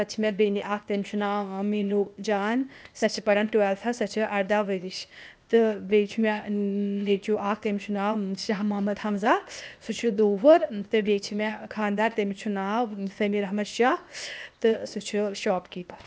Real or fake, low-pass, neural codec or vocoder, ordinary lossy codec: fake; none; codec, 16 kHz, 0.8 kbps, ZipCodec; none